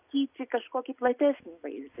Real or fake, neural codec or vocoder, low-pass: real; none; 3.6 kHz